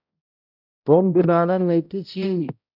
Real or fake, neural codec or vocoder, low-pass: fake; codec, 16 kHz, 0.5 kbps, X-Codec, HuBERT features, trained on balanced general audio; 5.4 kHz